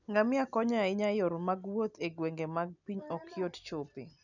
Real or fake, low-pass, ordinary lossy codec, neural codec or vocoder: real; 7.2 kHz; none; none